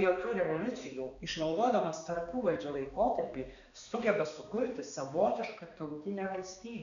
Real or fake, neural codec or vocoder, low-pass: fake; codec, 16 kHz, 2 kbps, X-Codec, HuBERT features, trained on balanced general audio; 7.2 kHz